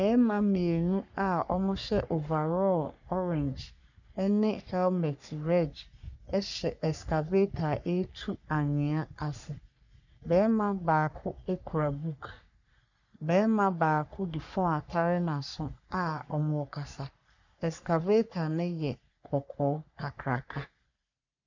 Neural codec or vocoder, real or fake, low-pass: codec, 44.1 kHz, 3.4 kbps, Pupu-Codec; fake; 7.2 kHz